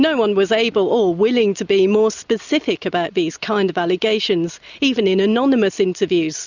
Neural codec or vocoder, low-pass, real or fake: none; 7.2 kHz; real